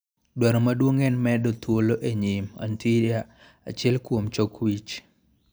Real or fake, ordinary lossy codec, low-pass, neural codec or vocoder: real; none; none; none